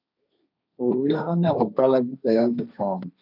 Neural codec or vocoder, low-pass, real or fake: codec, 16 kHz, 1.1 kbps, Voila-Tokenizer; 5.4 kHz; fake